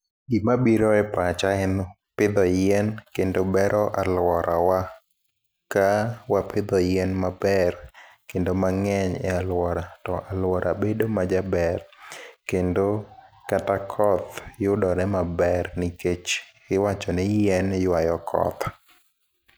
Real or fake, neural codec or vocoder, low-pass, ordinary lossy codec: real; none; none; none